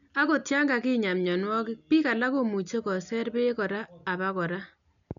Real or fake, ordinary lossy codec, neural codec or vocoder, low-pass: real; none; none; 7.2 kHz